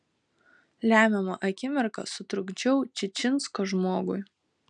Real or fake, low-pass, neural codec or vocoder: real; 9.9 kHz; none